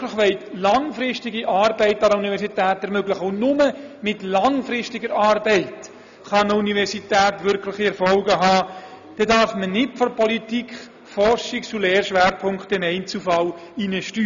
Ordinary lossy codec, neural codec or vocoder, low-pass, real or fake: none; none; 7.2 kHz; real